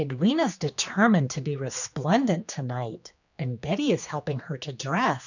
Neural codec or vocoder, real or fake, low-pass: codec, 16 kHz, 2 kbps, X-Codec, HuBERT features, trained on general audio; fake; 7.2 kHz